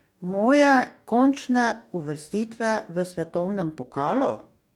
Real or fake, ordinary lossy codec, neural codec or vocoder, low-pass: fake; none; codec, 44.1 kHz, 2.6 kbps, DAC; 19.8 kHz